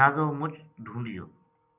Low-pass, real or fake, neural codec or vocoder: 3.6 kHz; real; none